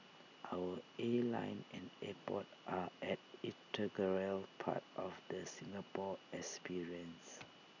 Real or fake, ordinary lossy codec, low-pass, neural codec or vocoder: real; none; 7.2 kHz; none